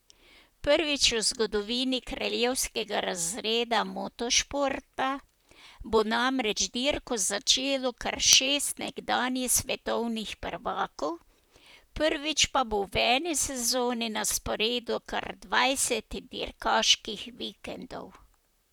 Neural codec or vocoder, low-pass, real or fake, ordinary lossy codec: vocoder, 44.1 kHz, 128 mel bands, Pupu-Vocoder; none; fake; none